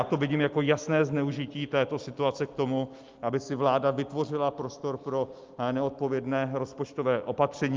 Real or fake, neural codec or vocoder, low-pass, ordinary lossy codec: real; none; 7.2 kHz; Opus, 24 kbps